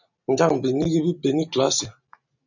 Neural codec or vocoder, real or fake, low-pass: none; real; 7.2 kHz